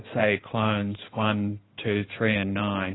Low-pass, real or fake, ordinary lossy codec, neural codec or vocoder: 7.2 kHz; fake; AAC, 16 kbps; codec, 16 kHz in and 24 kHz out, 2.2 kbps, FireRedTTS-2 codec